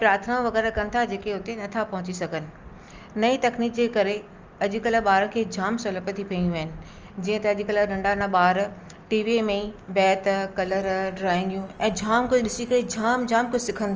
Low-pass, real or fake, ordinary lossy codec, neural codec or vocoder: 7.2 kHz; real; Opus, 24 kbps; none